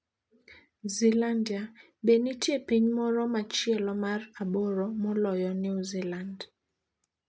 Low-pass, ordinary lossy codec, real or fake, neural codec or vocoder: none; none; real; none